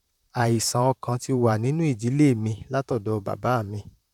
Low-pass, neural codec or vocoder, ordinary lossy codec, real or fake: 19.8 kHz; vocoder, 44.1 kHz, 128 mel bands, Pupu-Vocoder; none; fake